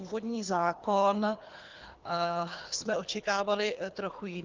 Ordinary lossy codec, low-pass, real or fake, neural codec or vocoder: Opus, 32 kbps; 7.2 kHz; fake; codec, 24 kHz, 3 kbps, HILCodec